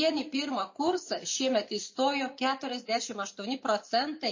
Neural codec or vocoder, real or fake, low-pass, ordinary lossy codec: none; real; 7.2 kHz; MP3, 32 kbps